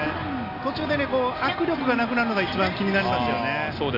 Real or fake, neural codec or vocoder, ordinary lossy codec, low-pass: real; none; AAC, 32 kbps; 5.4 kHz